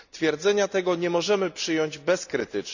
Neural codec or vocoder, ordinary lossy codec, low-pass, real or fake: none; none; 7.2 kHz; real